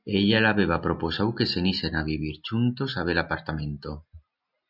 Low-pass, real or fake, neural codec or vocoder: 5.4 kHz; real; none